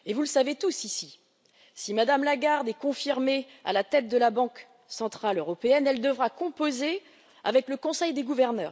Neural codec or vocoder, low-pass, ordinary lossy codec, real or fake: none; none; none; real